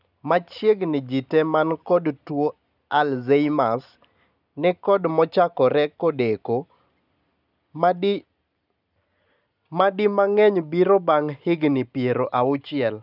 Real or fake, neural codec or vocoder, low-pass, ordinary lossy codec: real; none; 5.4 kHz; none